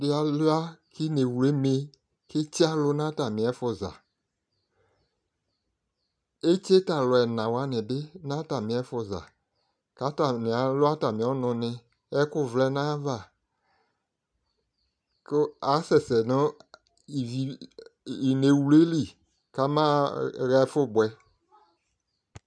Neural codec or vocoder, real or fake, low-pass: none; real; 9.9 kHz